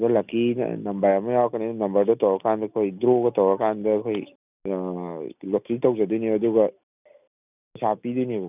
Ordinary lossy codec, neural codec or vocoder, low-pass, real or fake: none; none; 3.6 kHz; real